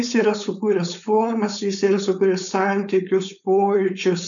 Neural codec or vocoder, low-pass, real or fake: codec, 16 kHz, 4.8 kbps, FACodec; 7.2 kHz; fake